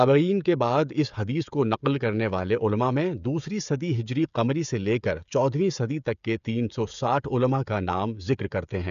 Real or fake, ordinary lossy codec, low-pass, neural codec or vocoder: fake; none; 7.2 kHz; codec, 16 kHz, 16 kbps, FreqCodec, smaller model